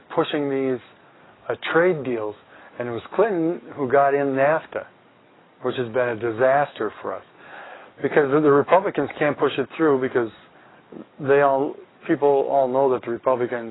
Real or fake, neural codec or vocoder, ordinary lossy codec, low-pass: fake; codec, 44.1 kHz, 7.8 kbps, DAC; AAC, 16 kbps; 7.2 kHz